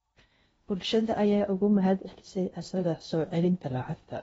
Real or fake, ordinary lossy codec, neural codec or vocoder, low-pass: fake; AAC, 24 kbps; codec, 16 kHz in and 24 kHz out, 0.8 kbps, FocalCodec, streaming, 65536 codes; 10.8 kHz